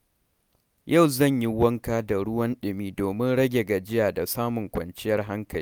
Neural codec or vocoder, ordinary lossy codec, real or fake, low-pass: none; none; real; none